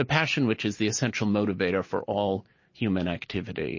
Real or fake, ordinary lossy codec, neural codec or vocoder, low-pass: real; MP3, 32 kbps; none; 7.2 kHz